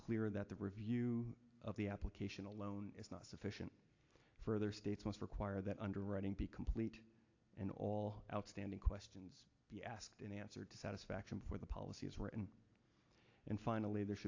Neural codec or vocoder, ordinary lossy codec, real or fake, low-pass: none; AAC, 48 kbps; real; 7.2 kHz